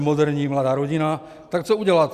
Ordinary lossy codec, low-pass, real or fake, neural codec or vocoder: Opus, 64 kbps; 14.4 kHz; real; none